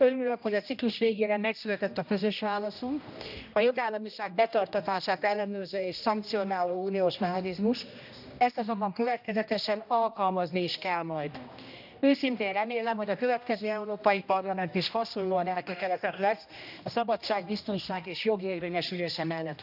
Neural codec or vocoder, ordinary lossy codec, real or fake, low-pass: codec, 16 kHz, 1 kbps, X-Codec, HuBERT features, trained on general audio; none; fake; 5.4 kHz